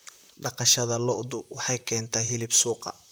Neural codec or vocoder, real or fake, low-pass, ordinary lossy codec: vocoder, 44.1 kHz, 128 mel bands, Pupu-Vocoder; fake; none; none